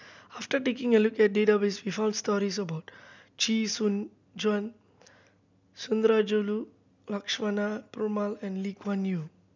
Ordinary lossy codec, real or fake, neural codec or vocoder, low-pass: none; real; none; 7.2 kHz